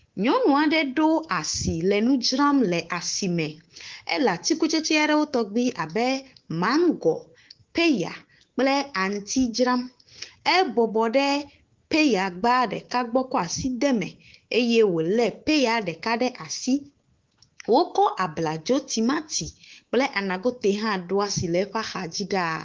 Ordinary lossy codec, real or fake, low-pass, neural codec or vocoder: Opus, 16 kbps; fake; 7.2 kHz; codec, 24 kHz, 3.1 kbps, DualCodec